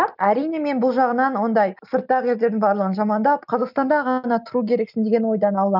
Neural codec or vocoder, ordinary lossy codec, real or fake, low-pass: none; none; real; 5.4 kHz